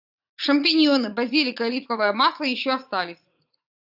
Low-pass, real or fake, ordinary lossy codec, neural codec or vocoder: 5.4 kHz; fake; AAC, 48 kbps; vocoder, 44.1 kHz, 80 mel bands, Vocos